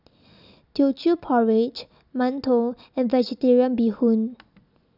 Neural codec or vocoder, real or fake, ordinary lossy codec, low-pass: none; real; none; 5.4 kHz